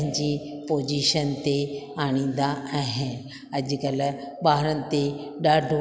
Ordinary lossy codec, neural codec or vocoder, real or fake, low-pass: none; none; real; none